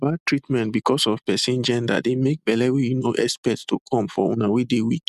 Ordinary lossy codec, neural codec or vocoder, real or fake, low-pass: none; none; real; 14.4 kHz